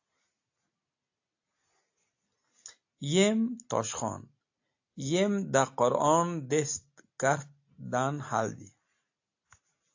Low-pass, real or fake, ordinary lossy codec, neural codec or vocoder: 7.2 kHz; real; AAC, 48 kbps; none